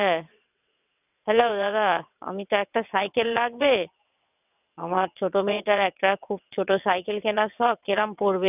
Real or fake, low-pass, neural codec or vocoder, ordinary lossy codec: fake; 3.6 kHz; vocoder, 22.05 kHz, 80 mel bands, WaveNeXt; none